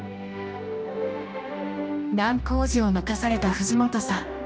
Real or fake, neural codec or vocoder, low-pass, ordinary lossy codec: fake; codec, 16 kHz, 1 kbps, X-Codec, HuBERT features, trained on general audio; none; none